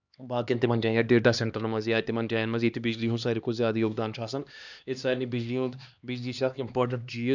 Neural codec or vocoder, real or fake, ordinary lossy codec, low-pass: codec, 16 kHz, 2 kbps, X-Codec, HuBERT features, trained on LibriSpeech; fake; none; 7.2 kHz